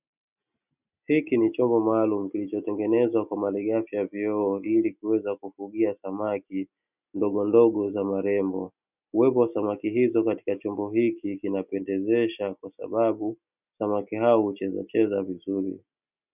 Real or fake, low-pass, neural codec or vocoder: real; 3.6 kHz; none